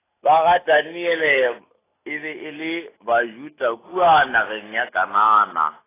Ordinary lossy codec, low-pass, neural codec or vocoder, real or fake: AAC, 16 kbps; 3.6 kHz; none; real